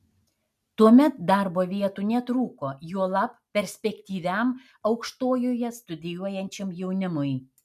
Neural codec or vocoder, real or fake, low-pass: none; real; 14.4 kHz